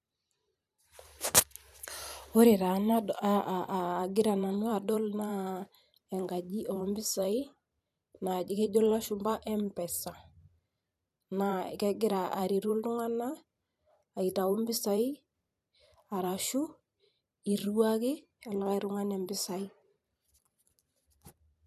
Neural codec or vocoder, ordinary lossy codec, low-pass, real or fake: vocoder, 44.1 kHz, 128 mel bands every 512 samples, BigVGAN v2; none; 14.4 kHz; fake